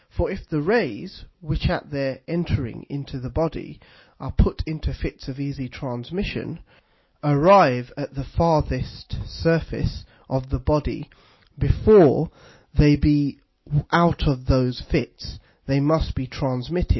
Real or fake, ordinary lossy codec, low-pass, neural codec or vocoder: real; MP3, 24 kbps; 7.2 kHz; none